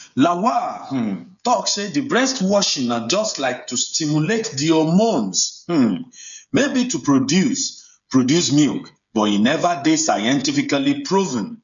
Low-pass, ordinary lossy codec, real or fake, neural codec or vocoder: 7.2 kHz; MP3, 96 kbps; fake; codec, 16 kHz, 8 kbps, FreqCodec, smaller model